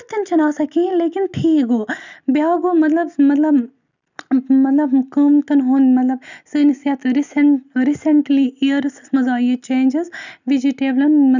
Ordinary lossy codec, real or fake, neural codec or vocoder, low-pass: none; real; none; 7.2 kHz